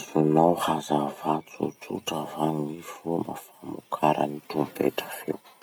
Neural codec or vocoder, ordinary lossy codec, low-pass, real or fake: none; none; none; real